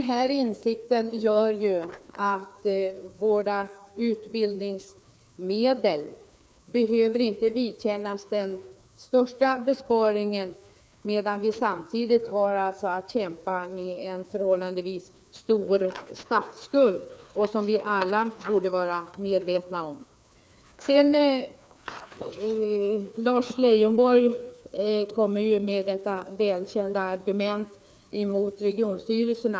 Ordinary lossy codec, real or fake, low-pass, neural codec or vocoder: none; fake; none; codec, 16 kHz, 2 kbps, FreqCodec, larger model